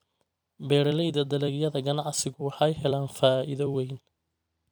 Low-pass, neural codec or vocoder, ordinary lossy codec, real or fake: none; vocoder, 44.1 kHz, 128 mel bands every 256 samples, BigVGAN v2; none; fake